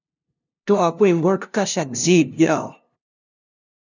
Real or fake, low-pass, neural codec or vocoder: fake; 7.2 kHz; codec, 16 kHz, 0.5 kbps, FunCodec, trained on LibriTTS, 25 frames a second